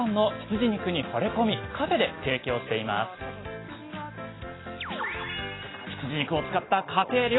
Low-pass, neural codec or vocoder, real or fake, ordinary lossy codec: 7.2 kHz; none; real; AAC, 16 kbps